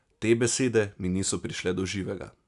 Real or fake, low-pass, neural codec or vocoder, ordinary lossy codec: real; 10.8 kHz; none; none